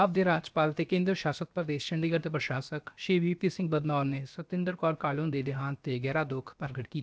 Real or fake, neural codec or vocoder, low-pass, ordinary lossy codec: fake; codec, 16 kHz, 0.7 kbps, FocalCodec; none; none